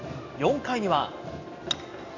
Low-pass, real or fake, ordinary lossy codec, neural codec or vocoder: 7.2 kHz; real; none; none